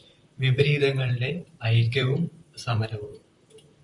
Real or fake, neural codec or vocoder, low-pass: fake; vocoder, 44.1 kHz, 128 mel bands, Pupu-Vocoder; 10.8 kHz